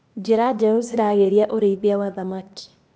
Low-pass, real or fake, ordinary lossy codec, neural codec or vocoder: none; fake; none; codec, 16 kHz, 0.8 kbps, ZipCodec